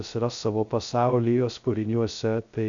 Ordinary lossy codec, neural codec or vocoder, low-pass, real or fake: MP3, 64 kbps; codec, 16 kHz, 0.2 kbps, FocalCodec; 7.2 kHz; fake